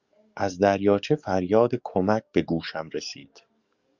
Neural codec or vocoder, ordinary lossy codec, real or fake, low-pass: codec, 44.1 kHz, 7.8 kbps, DAC; Opus, 64 kbps; fake; 7.2 kHz